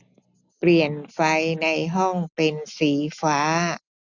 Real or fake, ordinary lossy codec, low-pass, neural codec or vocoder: real; none; 7.2 kHz; none